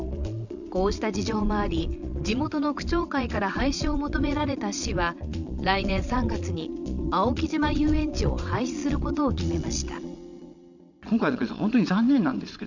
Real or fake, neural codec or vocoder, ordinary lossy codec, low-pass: fake; vocoder, 22.05 kHz, 80 mel bands, Vocos; none; 7.2 kHz